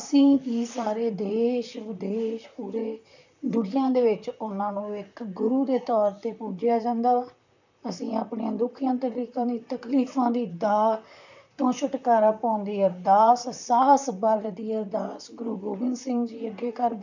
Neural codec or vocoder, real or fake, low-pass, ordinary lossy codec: vocoder, 44.1 kHz, 128 mel bands, Pupu-Vocoder; fake; 7.2 kHz; none